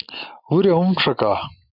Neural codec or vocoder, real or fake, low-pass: none; real; 5.4 kHz